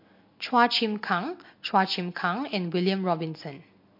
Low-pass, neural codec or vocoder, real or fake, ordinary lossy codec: 5.4 kHz; none; real; MP3, 32 kbps